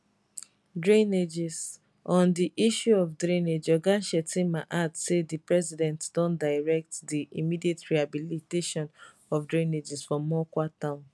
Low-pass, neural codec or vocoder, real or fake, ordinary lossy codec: none; none; real; none